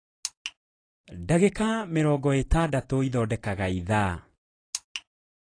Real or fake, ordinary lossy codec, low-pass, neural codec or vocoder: real; AAC, 32 kbps; 9.9 kHz; none